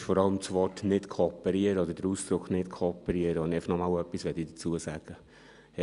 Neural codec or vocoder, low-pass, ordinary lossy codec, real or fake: none; 10.8 kHz; none; real